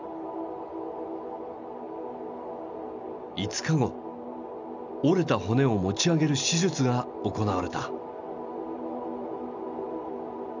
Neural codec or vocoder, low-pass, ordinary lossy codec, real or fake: none; 7.2 kHz; none; real